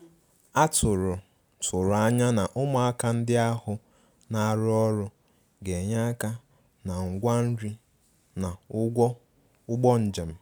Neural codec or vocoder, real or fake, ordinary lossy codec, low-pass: none; real; none; none